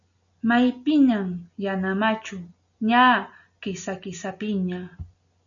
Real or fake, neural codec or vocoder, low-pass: real; none; 7.2 kHz